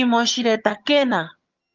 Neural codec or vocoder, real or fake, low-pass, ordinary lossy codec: vocoder, 22.05 kHz, 80 mel bands, HiFi-GAN; fake; 7.2 kHz; Opus, 32 kbps